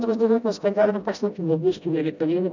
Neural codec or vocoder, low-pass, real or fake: codec, 16 kHz, 0.5 kbps, FreqCodec, smaller model; 7.2 kHz; fake